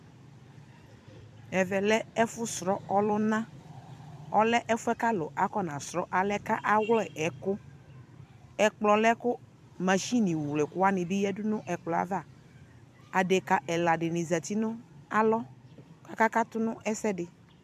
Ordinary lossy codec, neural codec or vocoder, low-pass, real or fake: MP3, 96 kbps; vocoder, 44.1 kHz, 128 mel bands every 256 samples, BigVGAN v2; 14.4 kHz; fake